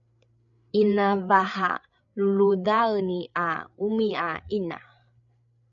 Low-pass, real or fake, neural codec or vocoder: 7.2 kHz; fake; codec, 16 kHz, 8 kbps, FreqCodec, larger model